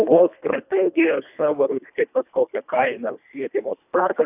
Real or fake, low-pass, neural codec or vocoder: fake; 3.6 kHz; codec, 24 kHz, 1.5 kbps, HILCodec